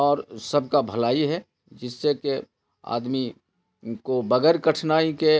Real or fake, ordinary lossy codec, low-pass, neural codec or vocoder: real; none; none; none